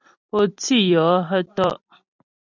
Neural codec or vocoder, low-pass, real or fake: vocoder, 44.1 kHz, 128 mel bands every 256 samples, BigVGAN v2; 7.2 kHz; fake